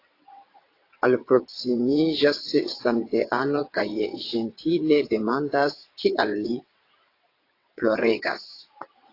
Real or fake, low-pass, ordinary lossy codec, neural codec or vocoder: fake; 5.4 kHz; AAC, 32 kbps; vocoder, 22.05 kHz, 80 mel bands, WaveNeXt